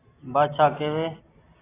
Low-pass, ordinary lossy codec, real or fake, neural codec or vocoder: 3.6 kHz; AAC, 16 kbps; real; none